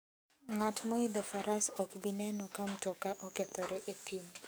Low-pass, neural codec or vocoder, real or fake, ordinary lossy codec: none; codec, 44.1 kHz, 7.8 kbps, DAC; fake; none